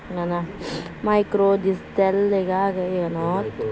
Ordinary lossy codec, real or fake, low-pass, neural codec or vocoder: none; real; none; none